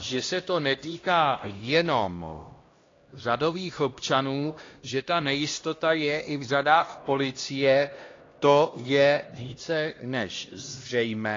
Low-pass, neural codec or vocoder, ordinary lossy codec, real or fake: 7.2 kHz; codec, 16 kHz, 1 kbps, X-Codec, HuBERT features, trained on LibriSpeech; AAC, 32 kbps; fake